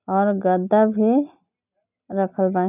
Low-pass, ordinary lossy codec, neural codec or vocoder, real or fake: 3.6 kHz; none; none; real